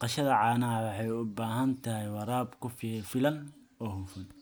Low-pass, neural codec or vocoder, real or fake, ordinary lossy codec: none; none; real; none